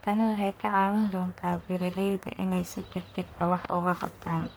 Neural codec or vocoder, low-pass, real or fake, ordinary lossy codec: codec, 44.1 kHz, 1.7 kbps, Pupu-Codec; none; fake; none